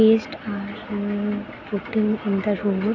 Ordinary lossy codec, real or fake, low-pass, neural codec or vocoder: none; real; 7.2 kHz; none